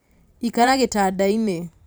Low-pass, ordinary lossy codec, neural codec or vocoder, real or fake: none; none; vocoder, 44.1 kHz, 128 mel bands every 512 samples, BigVGAN v2; fake